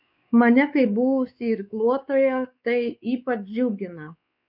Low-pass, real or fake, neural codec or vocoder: 5.4 kHz; fake; codec, 16 kHz, 4 kbps, X-Codec, WavLM features, trained on Multilingual LibriSpeech